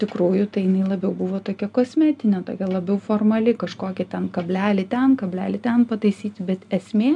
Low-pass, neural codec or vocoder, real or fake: 10.8 kHz; none; real